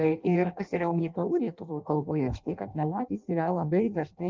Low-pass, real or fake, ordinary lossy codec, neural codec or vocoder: 7.2 kHz; fake; Opus, 24 kbps; codec, 16 kHz in and 24 kHz out, 0.6 kbps, FireRedTTS-2 codec